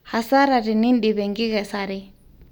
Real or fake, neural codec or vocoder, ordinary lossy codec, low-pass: real; none; none; none